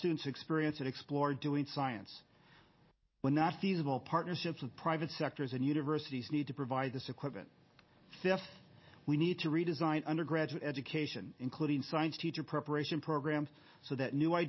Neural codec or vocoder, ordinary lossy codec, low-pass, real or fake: none; MP3, 24 kbps; 7.2 kHz; real